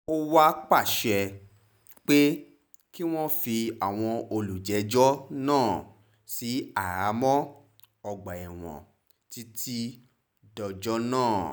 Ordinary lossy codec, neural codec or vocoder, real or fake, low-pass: none; none; real; none